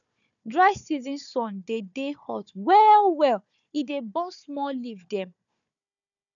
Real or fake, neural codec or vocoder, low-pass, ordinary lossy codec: fake; codec, 16 kHz, 4 kbps, FunCodec, trained on Chinese and English, 50 frames a second; 7.2 kHz; none